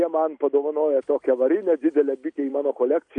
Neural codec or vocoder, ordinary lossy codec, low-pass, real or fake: none; AAC, 64 kbps; 9.9 kHz; real